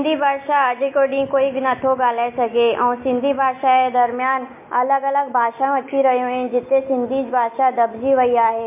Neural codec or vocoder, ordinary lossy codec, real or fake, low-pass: none; MP3, 24 kbps; real; 3.6 kHz